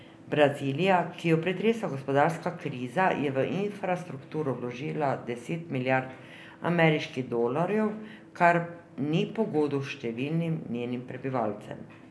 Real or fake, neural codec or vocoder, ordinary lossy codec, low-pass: real; none; none; none